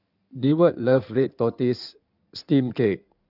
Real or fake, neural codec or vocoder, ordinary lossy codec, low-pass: fake; codec, 16 kHz in and 24 kHz out, 2.2 kbps, FireRedTTS-2 codec; none; 5.4 kHz